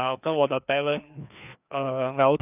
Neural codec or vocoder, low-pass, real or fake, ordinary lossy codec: codec, 16 kHz, 2 kbps, FreqCodec, larger model; 3.6 kHz; fake; none